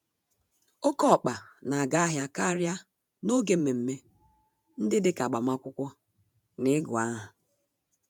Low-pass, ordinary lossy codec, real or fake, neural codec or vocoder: none; none; fake; vocoder, 48 kHz, 128 mel bands, Vocos